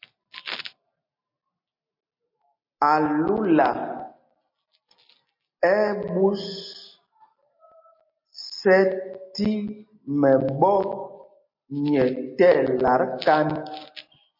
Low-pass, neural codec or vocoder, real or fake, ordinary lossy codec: 5.4 kHz; none; real; MP3, 32 kbps